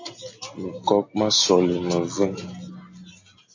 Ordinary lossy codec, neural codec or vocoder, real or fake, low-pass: AAC, 48 kbps; none; real; 7.2 kHz